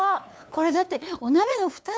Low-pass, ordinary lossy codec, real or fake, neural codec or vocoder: none; none; fake; codec, 16 kHz, 2 kbps, FunCodec, trained on LibriTTS, 25 frames a second